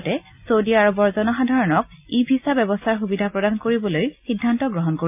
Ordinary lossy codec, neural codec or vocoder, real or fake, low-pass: AAC, 32 kbps; none; real; 3.6 kHz